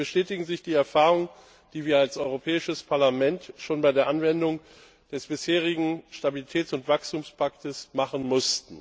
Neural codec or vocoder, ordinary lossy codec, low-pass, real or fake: none; none; none; real